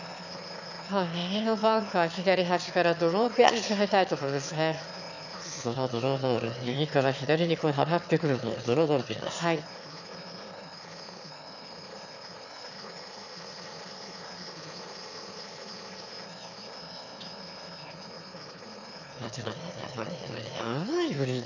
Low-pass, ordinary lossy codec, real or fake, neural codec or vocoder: 7.2 kHz; none; fake; autoencoder, 22.05 kHz, a latent of 192 numbers a frame, VITS, trained on one speaker